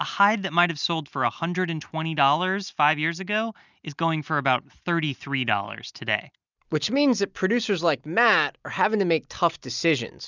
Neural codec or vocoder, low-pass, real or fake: none; 7.2 kHz; real